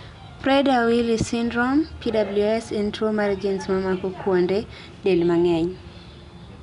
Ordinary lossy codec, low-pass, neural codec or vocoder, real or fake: none; 10.8 kHz; none; real